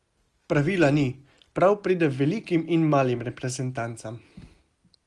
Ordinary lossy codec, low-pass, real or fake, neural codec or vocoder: Opus, 24 kbps; 10.8 kHz; real; none